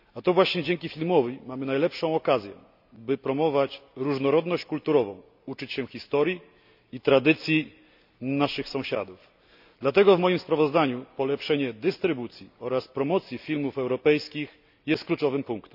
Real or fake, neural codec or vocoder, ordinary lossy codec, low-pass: real; none; none; 5.4 kHz